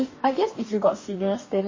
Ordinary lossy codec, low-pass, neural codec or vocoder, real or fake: MP3, 32 kbps; 7.2 kHz; codec, 44.1 kHz, 2.6 kbps, DAC; fake